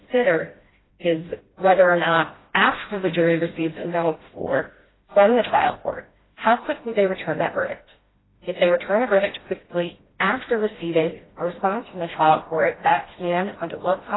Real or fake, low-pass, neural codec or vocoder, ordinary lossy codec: fake; 7.2 kHz; codec, 16 kHz, 1 kbps, FreqCodec, smaller model; AAC, 16 kbps